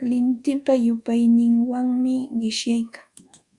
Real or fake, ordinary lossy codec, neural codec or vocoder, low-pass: fake; Opus, 64 kbps; codec, 24 kHz, 1.2 kbps, DualCodec; 10.8 kHz